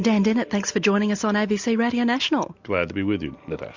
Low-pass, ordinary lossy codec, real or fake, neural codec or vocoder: 7.2 kHz; MP3, 64 kbps; real; none